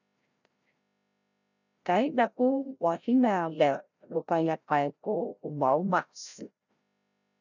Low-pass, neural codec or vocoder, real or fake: 7.2 kHz; codec, 16 kHz, 0.5 kbps, FreqCodec, larger model; fake